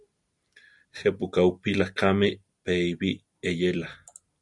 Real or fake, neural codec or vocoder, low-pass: real; none; 10.8 kHz